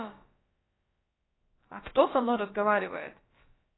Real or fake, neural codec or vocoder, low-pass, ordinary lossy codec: fake; codec, 16 kHz, about 1 kbps, DyCAST, with the encoder's durations; 7.2 kHz; AAC, 16 kbps